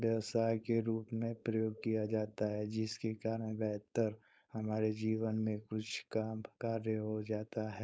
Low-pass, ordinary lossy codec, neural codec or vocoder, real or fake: none; none; codec, 16 kHz, 4.8 kbps, FACodec; fake